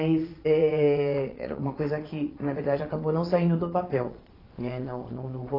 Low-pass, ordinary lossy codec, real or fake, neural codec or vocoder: 5.4 kHz; AAC, 24 kbps; fake; vocoder, 22.05 kHz, 80 mel bands, Vocos